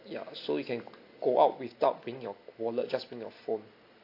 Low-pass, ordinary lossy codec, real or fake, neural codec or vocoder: 5.4 kHz; AAC, 32 kbps; real; none